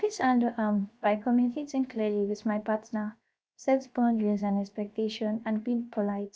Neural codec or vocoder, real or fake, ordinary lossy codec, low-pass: codec, 16 kHz, 0.7 kbps, FocalCodec; fake; none; none